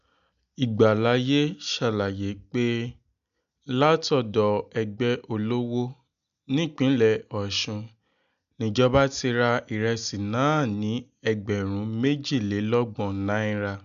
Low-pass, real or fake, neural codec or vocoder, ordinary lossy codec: 7.2 kHz; real; none; none